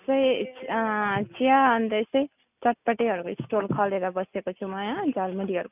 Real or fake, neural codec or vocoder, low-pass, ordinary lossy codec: real; none; 3.6 kHz; none